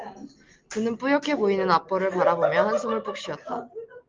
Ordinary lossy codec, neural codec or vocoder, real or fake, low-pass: Opus, 24 kbps; none; real; 7.2 kHz